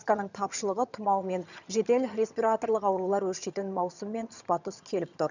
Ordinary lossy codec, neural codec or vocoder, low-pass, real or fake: none; vocoder, 22.05 kHz, 80 mel bands, HiFi-GAN; 7.2 kHz; fake